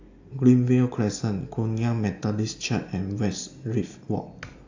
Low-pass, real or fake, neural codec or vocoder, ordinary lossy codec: 7.2 kHz; real; none; AAC, 48 kbps